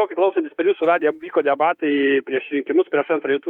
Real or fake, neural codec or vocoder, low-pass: fake; autoencoder, 48 kHz, 32 numbers a frame, DAC-VAE, trained on Japanese speech; 19.8 kHz